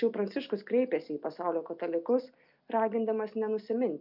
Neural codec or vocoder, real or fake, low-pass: none; real; 5.4 kHz